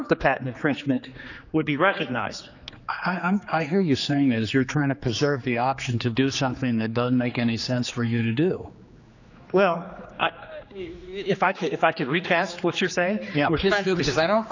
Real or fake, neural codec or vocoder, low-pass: fake; codec, 16 kHz, 2 kbps, X-Codec, HuBERT features, trained on general audio; 7.2 kHz